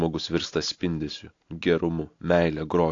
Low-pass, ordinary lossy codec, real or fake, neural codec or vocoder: 7.2 kHz; AAC, 48 kbps; real; none